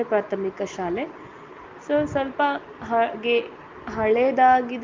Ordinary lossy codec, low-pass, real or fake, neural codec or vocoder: Opus, 32 kbps; 7.2 kHz; real; none